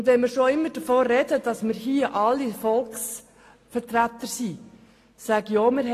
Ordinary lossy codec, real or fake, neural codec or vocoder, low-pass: AAC, 48 kbps; real; none; 14.4 kHz